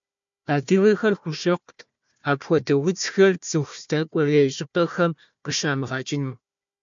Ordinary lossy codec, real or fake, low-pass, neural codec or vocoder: MP3, 64 kbps; fake; 7.2 kHz; codec, 16 kHz, 1 kbps, FunCodec, trained on Chinese and English, 50 frames a second